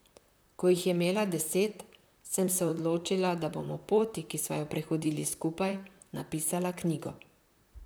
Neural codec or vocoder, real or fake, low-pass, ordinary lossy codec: vocoder, 44.1 kHz, 128 mel bands, Pupu-Vocoder; fake; none; none